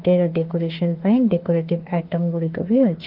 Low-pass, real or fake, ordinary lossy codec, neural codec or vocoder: 5.4 kHz; fake; Opus, 16 kbps; autoencoder, 48 kHz, 32 numbers a frame, DAC-VAE, trained on Japanese speech